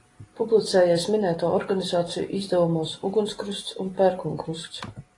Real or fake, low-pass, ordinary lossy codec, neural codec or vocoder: real; 10.8 kHz; AAC, 32 kbps; none